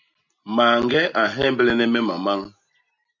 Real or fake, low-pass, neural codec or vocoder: real; 7.2 kHz; none